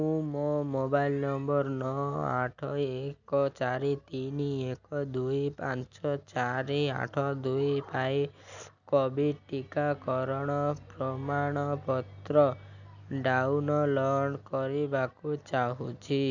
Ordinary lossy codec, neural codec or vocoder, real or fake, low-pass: none; none; real; 7.2 kHz